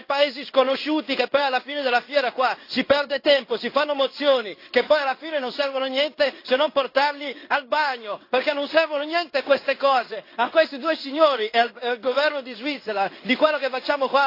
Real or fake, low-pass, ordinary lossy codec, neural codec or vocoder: fake; 5.4 kHz; AAC, 32 kbps; codec, 16 kHz in and 24 kHz out, 1 kbps, XY-Tokenizer